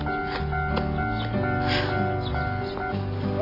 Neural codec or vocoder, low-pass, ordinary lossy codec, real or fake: none; 5.4 kHz; AAC, 24 kbps; real